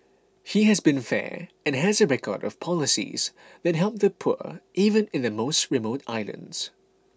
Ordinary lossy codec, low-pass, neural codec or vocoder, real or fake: none; none; none; real